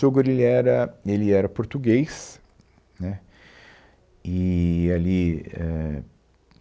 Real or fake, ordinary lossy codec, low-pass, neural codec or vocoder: real; none; none; none